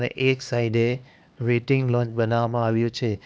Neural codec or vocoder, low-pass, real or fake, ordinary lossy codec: codec, 16 kHz, 2 kbps, X-Codec, HuBERT features, trained on LibriSpeech; none; fake; none